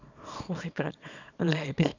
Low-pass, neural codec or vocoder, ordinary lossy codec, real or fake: 7.2 kHz; codec, 24 kHz, 0.9 kbps, WavTokenizer, small release; none; fake